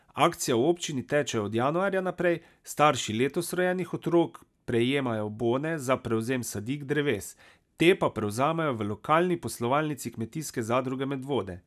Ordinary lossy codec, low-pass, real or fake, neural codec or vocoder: none; 14.4 kHz; real; none